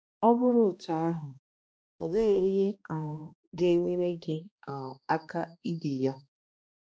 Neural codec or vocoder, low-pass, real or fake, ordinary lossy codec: codec, 16 kHz, 1 kbps, X-Codec, HuBERT features, trained on balanced general audio; none; fake; none